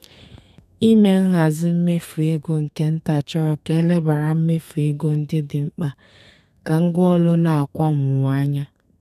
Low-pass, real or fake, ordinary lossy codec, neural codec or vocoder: 14.4 kHz; fake; none; codec, 32 kHz, 1.9 kbps, SNAC